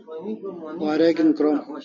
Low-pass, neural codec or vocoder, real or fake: 7.2 kHz; none; real